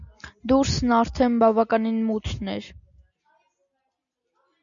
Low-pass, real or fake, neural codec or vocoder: 7.2 kHz; real; none